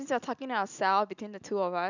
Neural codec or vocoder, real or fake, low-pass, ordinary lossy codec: none; real; 7.2 kHz; none